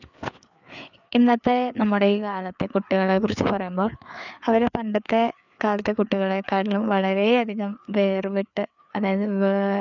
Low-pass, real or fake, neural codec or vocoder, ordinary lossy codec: 7.2 kHz; fake; codec, 16 kHz, 4 kbps, FreqCodec, larger model; none